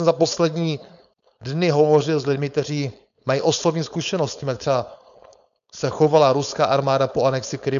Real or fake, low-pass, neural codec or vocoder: fake; 7.2 kHz; codec, 16 kHz, 4.8 kbps, FACodec